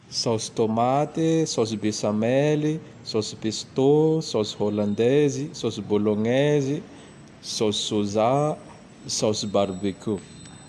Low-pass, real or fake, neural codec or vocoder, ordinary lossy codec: 14.4 kHz; real; none; AAC, 96 kbps